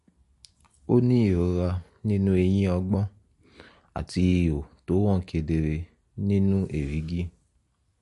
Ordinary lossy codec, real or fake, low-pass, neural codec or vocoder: MP3, 48 kbps; real; 14.4 kHz; none